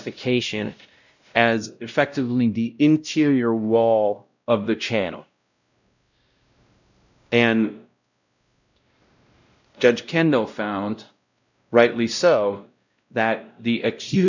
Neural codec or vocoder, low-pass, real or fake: codec, 16 kHz, 0.5 kbps, X-Codec, WavLM features, trained on Multilingual LibriSpeech; 7.2 kHz; fake